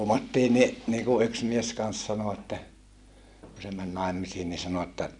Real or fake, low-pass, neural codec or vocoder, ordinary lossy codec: real; 10.8 kHz; none; none